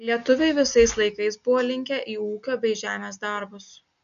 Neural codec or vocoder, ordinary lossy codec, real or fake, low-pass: none; AAC, 96 kbps; real; 7.2 kHz